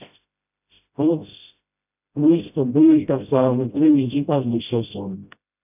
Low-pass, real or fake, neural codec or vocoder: 3.6 kHz; fake; codec, 16 kHz, 0.5 kbps, FreqCodec, smaller model